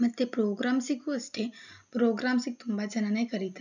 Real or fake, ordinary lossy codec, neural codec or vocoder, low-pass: real; none; none; 7.2 kHz